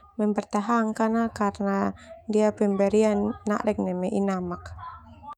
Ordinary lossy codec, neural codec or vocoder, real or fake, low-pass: none; autoencoder, 48 kHz, 128 numbers a frame, DAC-VAE, trained on Japanese speech; fake; 19.8 kHz